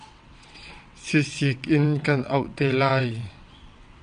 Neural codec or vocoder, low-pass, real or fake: vocoder, 22.05 kHz, 80 mel bands, WaveNeXt; 9.9 kHz; fake